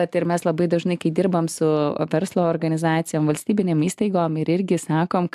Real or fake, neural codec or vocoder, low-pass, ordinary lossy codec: real; none; 14.4 kHz; AAC, 96 kbps